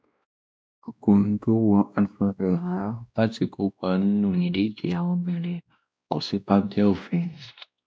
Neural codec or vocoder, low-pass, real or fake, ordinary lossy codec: codec, 16 kHz, 1 kbps, X-Codec, WavLM features, trained on Multilingual LibriSpeech; none; fake; none